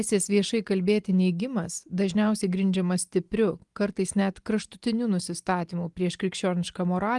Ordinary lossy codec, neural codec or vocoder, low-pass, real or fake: Opus, 24 kbps; none; 10.8 kHz; real